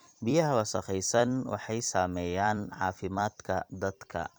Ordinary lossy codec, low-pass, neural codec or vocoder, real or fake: none; none; vocoder, 44.1 kHz, 128 mel bands every 256 samples, BigVGAN v2; fake